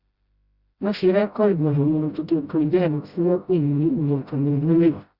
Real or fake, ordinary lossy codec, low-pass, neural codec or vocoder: fake; Opus, 64 kbps; 5.4 kHz; codec, 16 kHz, 0.5 kbps, FreqCodec, smaller model